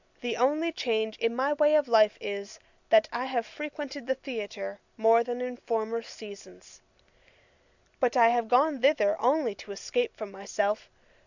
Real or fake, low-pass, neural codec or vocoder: real; 7.2 kHz; none